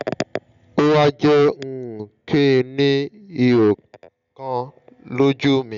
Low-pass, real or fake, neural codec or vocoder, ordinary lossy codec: 7.2 kHz; real; none; none